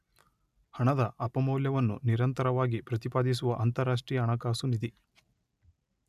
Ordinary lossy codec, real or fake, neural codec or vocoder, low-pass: none; real; none; 14.4 kHz